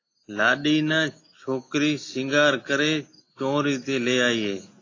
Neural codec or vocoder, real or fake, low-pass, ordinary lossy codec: none; real; 7.2 kHz; AAC, 32 kbps